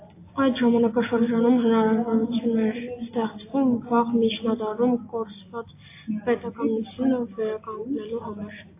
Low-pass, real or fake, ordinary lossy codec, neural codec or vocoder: 3.6 kHz; real; AAC, 24 kbps; none